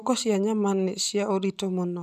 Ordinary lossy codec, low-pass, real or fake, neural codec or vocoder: none; 14.4 kHz; real; none